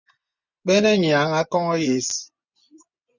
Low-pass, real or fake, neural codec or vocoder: 7.2 kHz; fake; vocoder, 24 kHz, 100 mel bands, Vocos